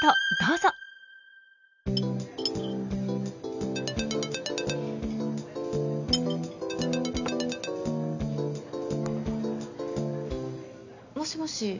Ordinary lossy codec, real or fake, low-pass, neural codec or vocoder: none; real; 7.2 kHz; none